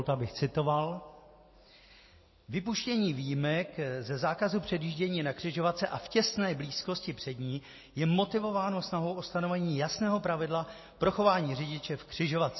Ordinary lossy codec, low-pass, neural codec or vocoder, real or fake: MP3, 24 kbps; 7.2 kHz; none; real